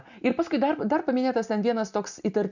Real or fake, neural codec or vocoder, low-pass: real; none; 7.2 kHz